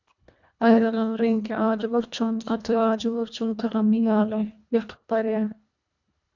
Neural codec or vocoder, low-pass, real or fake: codec, 24 kHz, 1.5 kbps, HILCodec; 7.2 kHz; fake